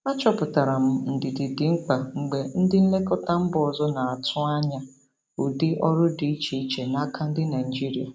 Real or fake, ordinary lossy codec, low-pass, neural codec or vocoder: real; none; none; none